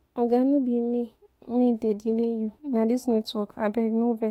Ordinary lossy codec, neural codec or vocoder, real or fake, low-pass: AAC, 48 kbps; autoencoder, 48 kHz, 32 numbers a frame, DAC-VAE, trained on Japanese speech; fake; 19.8 kHz